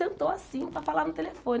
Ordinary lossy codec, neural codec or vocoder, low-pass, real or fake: none; none; none; real